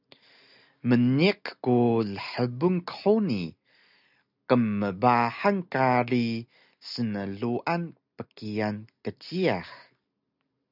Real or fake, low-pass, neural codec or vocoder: real; 5.4 kHz; none